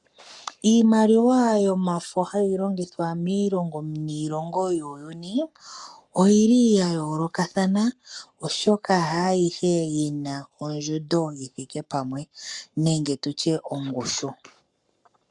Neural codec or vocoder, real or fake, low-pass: codec, 44.1 kHz, 7.8 kbps, Pupu-Codec; fake; 10.8 kHz